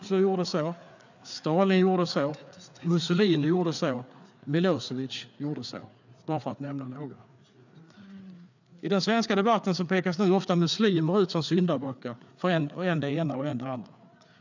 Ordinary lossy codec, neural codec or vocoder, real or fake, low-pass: none; codec, 16 kHz, 4 kbps, FreqCodec, larger model; fake; 7.2 kHz